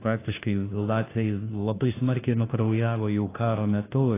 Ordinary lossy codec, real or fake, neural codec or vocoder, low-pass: AAC, 16 kbps; fake; codec, 16 kHz, 1 kbps, FunCodec, trained on LibriTTS, 50 frames a second; 3.6 kHz